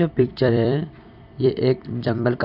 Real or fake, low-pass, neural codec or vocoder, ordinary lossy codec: fake; 5.4 kHz; vocoder, 44.1 kHz, 128 mel bands every 256 samples, BigVGAN v2; none